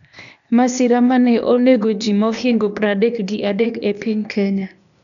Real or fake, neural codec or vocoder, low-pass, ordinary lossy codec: fake; codec, 16 kHz, 0.8 kbps, ZipCodec; 7.2 kHz; none